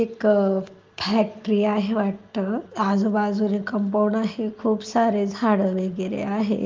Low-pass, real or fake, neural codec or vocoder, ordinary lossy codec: 7.2 kHz; real; none; Opus, 32 kbps